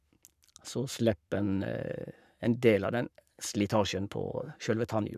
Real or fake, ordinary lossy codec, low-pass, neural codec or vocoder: fake; none; 14.4 kHz; codec, 44.1 kHz, 7.8 kbps, Pupu-Codec